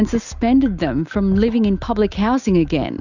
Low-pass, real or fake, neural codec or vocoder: 7.2 kHz; real; none